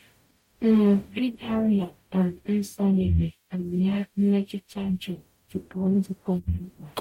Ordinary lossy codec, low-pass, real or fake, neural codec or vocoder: MP3, 64 kbps; 19.8 kHz; fake; codec, 44.1 kHz, 0.9 kbps, DAC